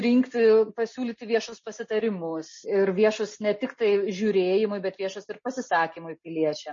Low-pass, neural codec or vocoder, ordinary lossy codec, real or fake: 7.2 kHz; none; MP3, 32 kbps; real